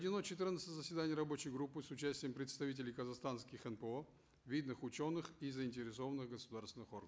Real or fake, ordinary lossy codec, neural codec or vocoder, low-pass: real; none; none; none